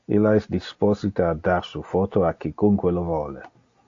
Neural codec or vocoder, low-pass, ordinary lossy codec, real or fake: none; 7.2 kHz; AAC, 48 kbps; real